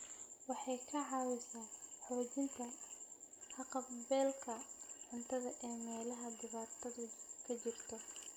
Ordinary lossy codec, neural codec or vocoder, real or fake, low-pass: none; none; real; none